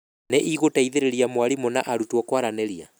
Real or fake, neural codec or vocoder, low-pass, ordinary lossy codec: real; none; none; none